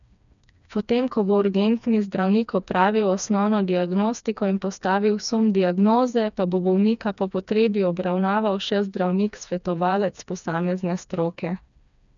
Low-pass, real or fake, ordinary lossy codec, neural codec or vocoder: 7.2 kHz; fake; none; codec, 16 kHz, 2 kbps, FreqCodec, smaller model